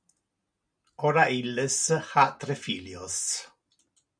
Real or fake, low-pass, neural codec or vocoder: real; 9.9 kHz; none